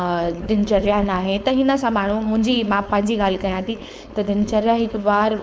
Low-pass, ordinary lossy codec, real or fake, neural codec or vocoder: none; none; fake; codec, 16 kHz, 4.8 kbps, FACodec